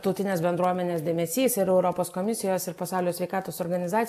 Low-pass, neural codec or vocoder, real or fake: 14.4 kHz; none; real